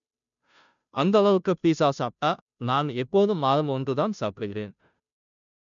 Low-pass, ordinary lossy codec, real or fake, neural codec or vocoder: 7.2 kHz; none; fake; codec, 16 kHz, 0.5 kbps, FunCodec, trained on Chinese and English, 25 frames a second